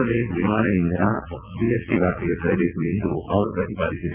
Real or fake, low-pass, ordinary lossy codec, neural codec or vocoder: fake; 3.6 kHz; none; vocoder, 22.05 kHz, 80 mel bands, WaveNeXt